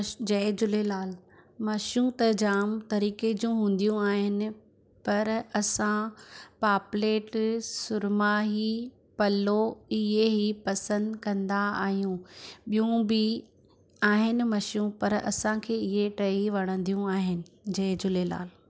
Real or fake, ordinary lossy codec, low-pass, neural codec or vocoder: real; none; none; none